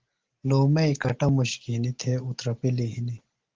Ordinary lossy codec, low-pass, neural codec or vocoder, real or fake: Opus, 16 kbps; 7.2 kHz; none; real